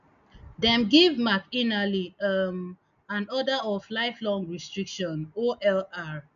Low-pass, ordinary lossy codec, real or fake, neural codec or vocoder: 7.2 kHz; none; real; none